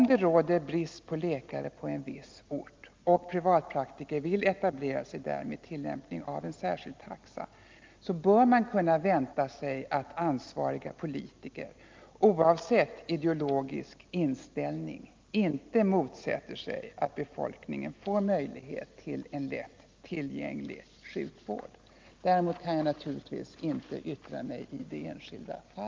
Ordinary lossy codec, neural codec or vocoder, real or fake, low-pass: Opus, 24 kbps; none; real; 7.2 kHz